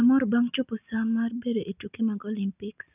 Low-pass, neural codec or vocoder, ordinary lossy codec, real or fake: 3.6 kHz; none; none; real